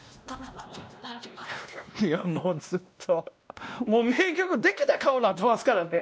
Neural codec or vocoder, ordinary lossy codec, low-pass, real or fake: codec, 16 kHz, 1 kbps, X-Codec, WavLM features, trained on Multilingual LibriSpeech; none; none; fake